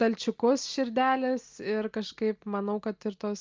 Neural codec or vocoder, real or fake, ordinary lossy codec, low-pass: none; real; Opus, 32 kbps; 7.2 kHz